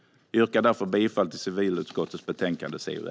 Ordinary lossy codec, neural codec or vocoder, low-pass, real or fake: none; none; none; real